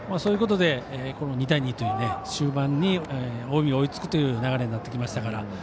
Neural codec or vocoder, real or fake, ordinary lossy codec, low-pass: none; real; none; none